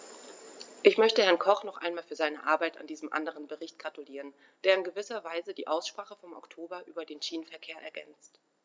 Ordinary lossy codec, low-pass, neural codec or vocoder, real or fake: none; none; none; real